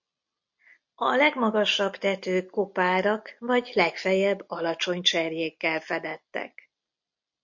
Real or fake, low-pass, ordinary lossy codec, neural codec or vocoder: real; 7.2 kHz; MP3, 48 kbps; none